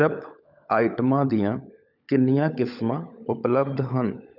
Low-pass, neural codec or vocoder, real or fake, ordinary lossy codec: 5.4 kHz; codec, 16 kHz, 16 kbps, FunCodec, trained on LibriTTS, 50 frames a second; fake; none